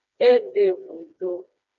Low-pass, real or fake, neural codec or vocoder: 7.2 kHz; fake; codec, 16 kHz, 2 kbps, FreqCodec, smaller model